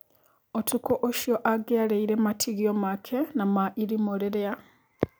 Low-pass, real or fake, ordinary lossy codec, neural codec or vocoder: none; real; none; none